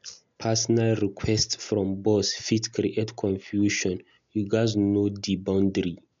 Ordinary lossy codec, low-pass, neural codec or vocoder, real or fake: MP3, 64 kbps; 7.2 kHz; none; real